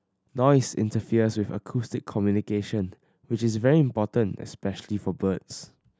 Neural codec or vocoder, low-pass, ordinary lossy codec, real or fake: none; none; none; real